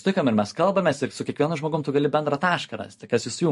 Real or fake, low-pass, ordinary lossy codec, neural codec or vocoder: fake; 14.4 kHz; MP3, 48 kbps; vocoder, 44.1 kHz, 128 mel bands every 512 samples, BigVGAN v2